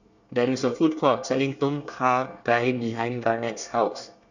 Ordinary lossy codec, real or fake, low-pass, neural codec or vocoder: none; fake; 7.2 kHz; codec, 24 kHz, 1 kbps, SNAC